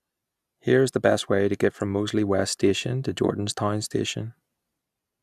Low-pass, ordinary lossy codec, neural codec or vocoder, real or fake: 14.4 kHz; none; none; real